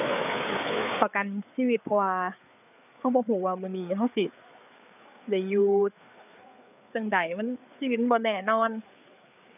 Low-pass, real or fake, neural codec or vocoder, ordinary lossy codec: 3.6 kHz; fake; codec, 16 kHz, 4 kbps, FreqCodec, larger model; none